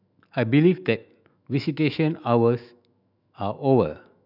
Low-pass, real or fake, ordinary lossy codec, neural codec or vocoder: 5.4 kHz; fake; none; autoencoder, 48 kHz, 128 numbers a frame, DAC-VAE, trained on Japanese speech